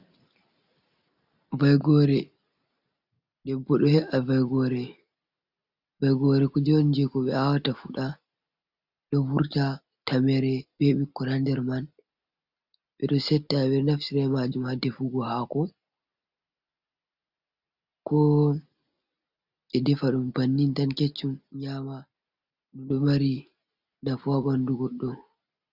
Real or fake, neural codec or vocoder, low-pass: real; none; 5.4 kHz